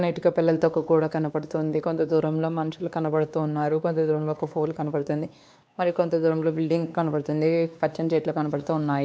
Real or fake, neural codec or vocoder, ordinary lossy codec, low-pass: fake; codec, 16 kHz, 2 kbps, X-Codec, WavLM features, trained on Multilingual LibriSpeech; none; none